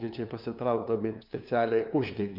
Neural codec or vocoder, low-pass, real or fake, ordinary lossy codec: codec, 16 kHz, 2 kbps, FunCodec, trained on LibriTTS, 25 frames a second; 5.4 kHz; fake; Opus, 64 kbps